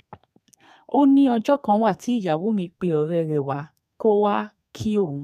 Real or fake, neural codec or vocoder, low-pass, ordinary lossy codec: fake; codec, 32 kHz, 1.9 kbps, SNAC; 14.4 kHz; none